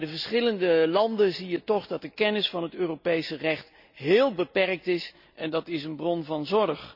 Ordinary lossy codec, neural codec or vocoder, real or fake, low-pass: none; none; real; 5.4 kHz